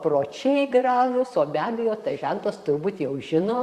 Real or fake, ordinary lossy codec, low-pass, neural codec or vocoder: fake; Opus, 64 kbps; 14.4 kHz; vocoder, 44.1 kHz, 128 mel bands, Pupu-Vocoder